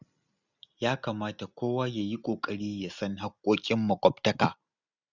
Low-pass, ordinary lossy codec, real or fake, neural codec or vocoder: 7.2 kHz; none; real; none